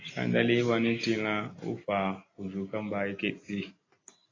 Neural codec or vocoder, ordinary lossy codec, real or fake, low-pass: none; AAC, 32 kbps; real; 7.2 kHz